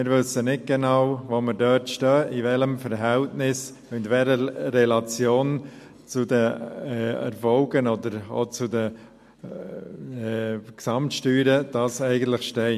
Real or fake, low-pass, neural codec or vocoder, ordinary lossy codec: real; 14.4 kHz; none; MP3, 64 kbps